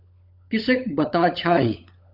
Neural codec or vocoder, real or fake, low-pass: codec, 16 kHz, 16 kbps, FunCodec, trained on LibriTTS, 50 frames a second; fake; 5.4 kHz